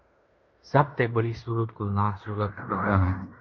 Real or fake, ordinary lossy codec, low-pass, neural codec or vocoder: fake; MP3, 64 kbps; 7.2 kHz; codec, 16 kHz in and 24 kHz out, 0.9 kbps, LongCat-Audio-Codec, fine tuned four codebook decoder